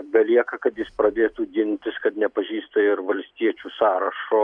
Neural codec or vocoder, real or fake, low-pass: vocoder, 44.1 kHz, 128 mel bands every 256 samples, BigVGAN v2; fake; 9.9 kHz